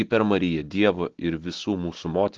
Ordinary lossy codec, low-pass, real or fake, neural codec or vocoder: Opus, 16 kbps; 7.2 kHz; real; none